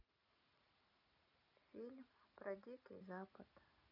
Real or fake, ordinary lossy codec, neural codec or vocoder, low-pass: real; none; none; 5.4 kHz